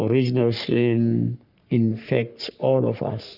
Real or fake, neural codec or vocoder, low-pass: fake; codec, 44.1 kHz, 3.4 kbps, Pupu-Codec; 5.4 kHz